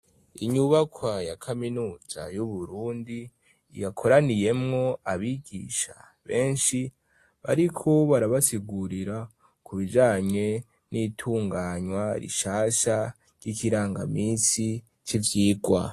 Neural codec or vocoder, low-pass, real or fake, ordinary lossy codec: none; 14.4 kHz; real; AAC, 48 kbps